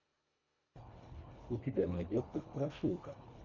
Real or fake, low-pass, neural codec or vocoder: fake; 7.2 kHz; codec, 24 kHz, 1.5 kbps, HILCodec